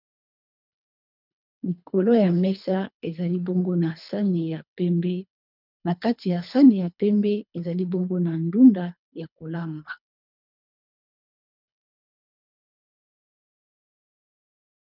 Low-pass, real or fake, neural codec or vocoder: 5.4 kHz; fake; codec, 24 kHz, 3 kbps, HILCodec